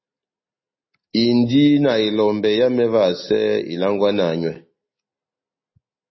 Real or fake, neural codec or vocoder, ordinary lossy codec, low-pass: real; none; MP3, 24 kbps; 7.2 kHz